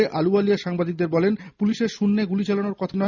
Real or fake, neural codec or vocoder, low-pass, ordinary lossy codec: real; none; 7.2 kHz; none